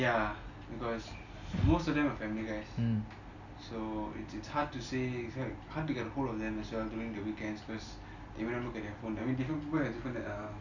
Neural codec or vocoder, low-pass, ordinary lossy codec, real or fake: none; 7.2 kHz; none; real